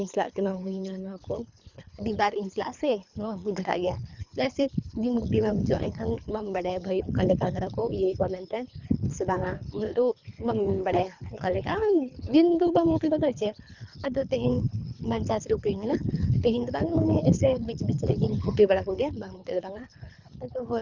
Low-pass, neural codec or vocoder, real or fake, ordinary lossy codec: 7.2 kHz; codec, 24 kHz, 3 kbps, HILCodec; fake; Opus, 64 kbps